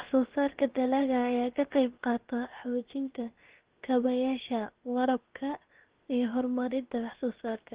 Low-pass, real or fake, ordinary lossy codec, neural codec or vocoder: 3.6 kHz; fake; Opus, 16 kbps; codec, 16 kHz, 0.7 kbps, FocalCodec